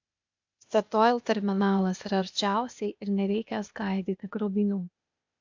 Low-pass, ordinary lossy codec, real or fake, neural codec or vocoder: 7.2 kHz; MP3, 64 kbps; fake; codec, 16 kHz, 0.8 kbps, ZipCodec